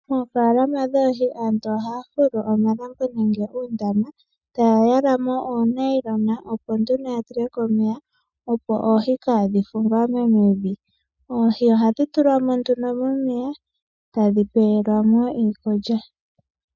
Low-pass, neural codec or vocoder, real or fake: 7.2 kHz; none; real